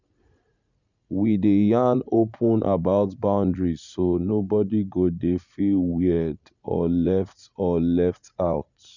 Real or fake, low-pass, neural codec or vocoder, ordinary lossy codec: fake; 7.2 kHz; vocoder, 44.1 kHz, 80 mel bands, Vocos; none